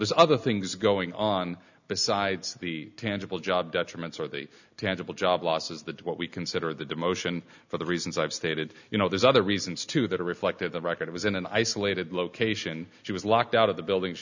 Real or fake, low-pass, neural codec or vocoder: real; 7.2 kHz; none